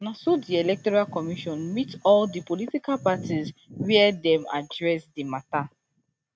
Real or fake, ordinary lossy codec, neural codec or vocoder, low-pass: real; none; none; none